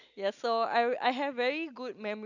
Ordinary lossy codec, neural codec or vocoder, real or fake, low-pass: none; none; real; 7.2 kHz